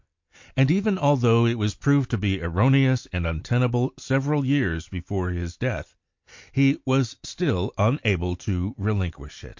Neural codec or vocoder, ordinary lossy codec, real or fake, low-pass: none; MP3, 48 kbps; real; 7.2 kHz